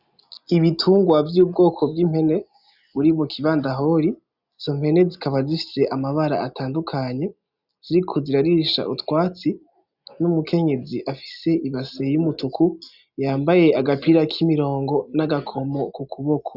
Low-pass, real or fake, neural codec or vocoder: 5.4 kHz; real; none